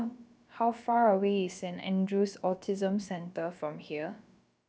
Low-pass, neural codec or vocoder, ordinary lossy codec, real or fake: none; codec, 16 kHz, about 1 kbps, DyCAST, with the encoder's durations; none; fake